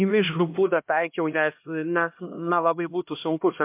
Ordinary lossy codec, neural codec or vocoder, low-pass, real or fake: MP3, 32 kbps; codec, 16 kHz, 1 kbps, X-Codec, HuBERT features, trained on LibriSpeech; 3.6 kHz; fake